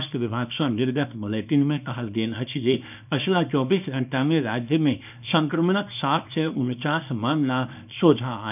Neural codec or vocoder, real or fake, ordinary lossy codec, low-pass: codec, 24 kHz, 0.9 kbps, WavTokenizer, small release; fake; none; 3.6 kHz